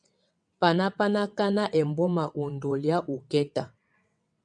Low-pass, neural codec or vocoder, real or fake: 9.9 kHz; vocoder, 22.05 kHz, 80 mel bands, WaveNeXt; fake